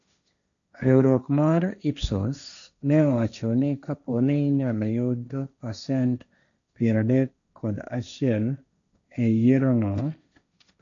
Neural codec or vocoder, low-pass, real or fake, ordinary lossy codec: codec, 16 kHz, 1.1 kbps, Voila-Tokenizer; 7.2 kHz; fake; none